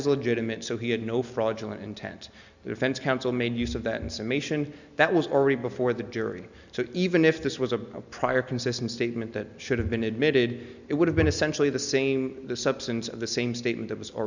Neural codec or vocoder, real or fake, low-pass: none; real; 7.2 kHz